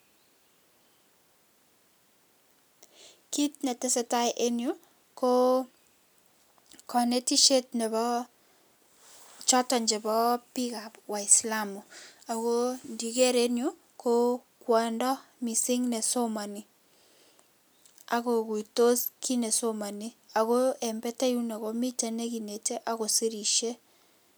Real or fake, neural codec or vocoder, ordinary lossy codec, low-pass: real; none; none; none